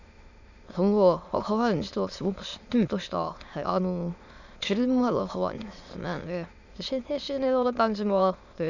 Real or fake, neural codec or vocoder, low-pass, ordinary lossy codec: fake; autoencoder, 22.05 kHz, a latent of 192 numbers a frame, VITS, trained on many speakers; 7.2 kHz; none